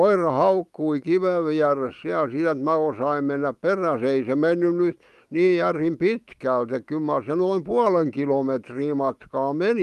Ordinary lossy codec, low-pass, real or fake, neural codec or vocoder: Opus, 32 kbps; 14.4 kHz; fake; autoencoder, 48 kHz, 128 numbers a frame, DAC-VAE, trained on Japanese speech